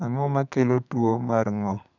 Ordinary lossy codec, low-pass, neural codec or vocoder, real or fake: none; 7.2 kHz; codec, 32 kHz, 1.9 kbps, SNAC; fake